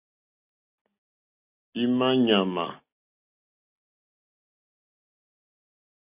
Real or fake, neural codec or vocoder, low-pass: real; none; 3.6 kHz